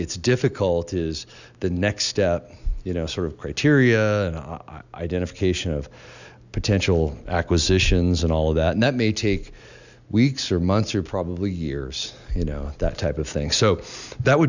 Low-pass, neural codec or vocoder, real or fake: 7.2 kHz; none; real